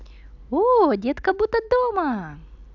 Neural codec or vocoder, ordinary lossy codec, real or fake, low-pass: none; none; real; 7.2 kHz